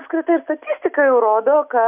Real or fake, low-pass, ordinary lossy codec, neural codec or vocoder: real; 3.6 kHz; AAC, 32 kbps; none